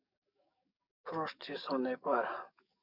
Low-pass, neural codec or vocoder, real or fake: 5.4 kHz; codec, 44.1 kHz, 7.8 kbps, DAC; fake